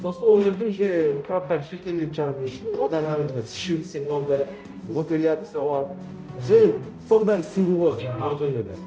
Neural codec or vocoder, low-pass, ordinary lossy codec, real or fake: codec, 16 kHz, 0.5 kbps, X-Codec, HuBERT features, trained on balanced general audio; none; none; fake